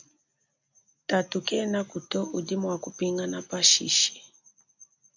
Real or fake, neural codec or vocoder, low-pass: real; none; 7.2 kHz